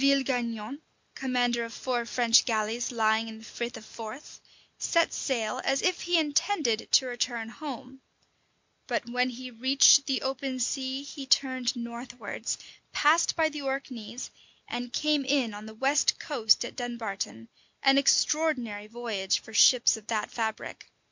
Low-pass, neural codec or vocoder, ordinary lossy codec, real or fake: 7.2 kHz; none; MP3, 64 kbps; real